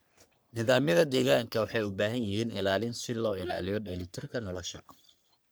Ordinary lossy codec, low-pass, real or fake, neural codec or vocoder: none; none; fake; codec, 44.1 kHz, 3.4 kbps, Pupu-Codec